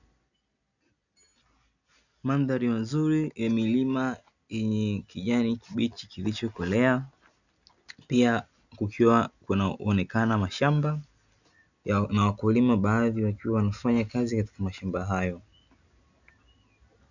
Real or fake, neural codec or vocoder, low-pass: real; none; 7.2 kHz